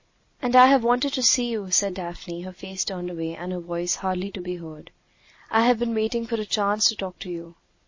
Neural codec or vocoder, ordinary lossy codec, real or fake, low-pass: none; MP3, 32 kbps; real; 7.2 kHz